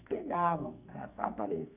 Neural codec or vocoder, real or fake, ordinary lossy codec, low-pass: codec, 44.1 kHz, 2.6 kbps, SNAC; fake; none; 3.6 kHz